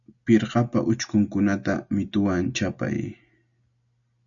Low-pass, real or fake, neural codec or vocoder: 7.2 kHz; real; none